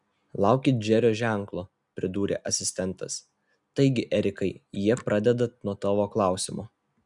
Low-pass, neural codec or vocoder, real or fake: 10.8 kHz; none; real